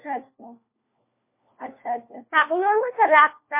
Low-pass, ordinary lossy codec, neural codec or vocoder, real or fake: 3.6 kHz; MP3, 24 kbps; codec, 16 kHz, 1 kbps, FunCodec, trained on LibriTTS, 50 frames a second; fake